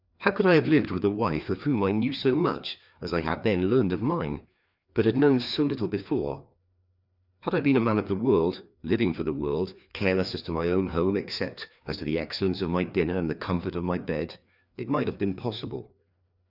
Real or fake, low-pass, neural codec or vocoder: fake; 5.4 kHz; codec, 16 kHz, 2 kbps, FreqCodec, larger model